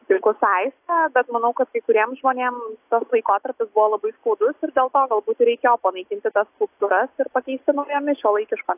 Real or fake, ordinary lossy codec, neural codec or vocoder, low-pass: real; AAC, 32 kbps; none; 3.6 kHz